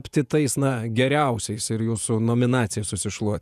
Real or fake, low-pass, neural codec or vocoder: fake; 14.4 kHz; vocoder, 48 kHz, 128 mel bands, Vocos